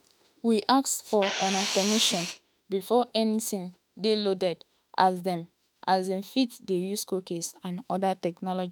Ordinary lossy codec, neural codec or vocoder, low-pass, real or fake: none; autoencoder, 48 kHz, 32 numbers a frame, DAC-VAE, trained on Japanese speech; none; fake